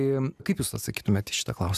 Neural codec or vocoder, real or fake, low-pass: none; real; 14.4 kHz